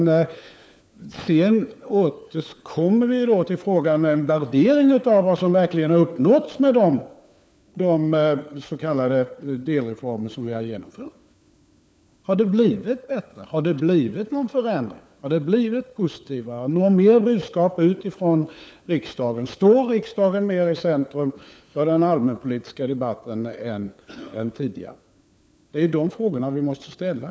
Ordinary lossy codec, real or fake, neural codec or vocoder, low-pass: none; fake; codec, 16 kHz, 4 kbps, FunCodec, trained on LibriTTS, 50 frames a second; none